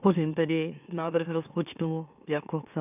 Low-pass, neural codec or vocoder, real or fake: 3.6 kHz; autoencoder, 44.1 kHz, a latent of 192 numbers a frame, MeloTTS; fake